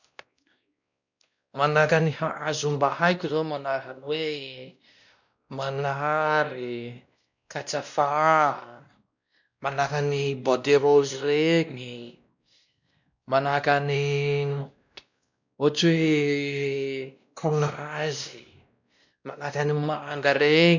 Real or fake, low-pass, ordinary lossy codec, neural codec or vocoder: fake; 7.2 kHz; none; codec, 16 kHz, 1 kbps, X-Codec, WavLM features, trained on Multilingual LibriSpeech